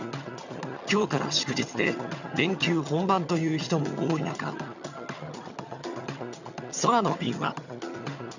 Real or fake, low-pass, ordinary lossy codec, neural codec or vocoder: fake; 7.2 kHz; none; vocoder, 22.05 kHz, 80 mel bands, HiFi-GAN